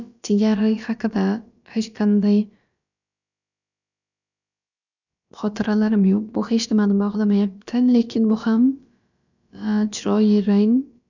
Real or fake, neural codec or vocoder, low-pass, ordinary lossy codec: fake; codec, 16 kHz, about 1 kbps, DyCAST, with the encoder's durations; 7.2 kHz; none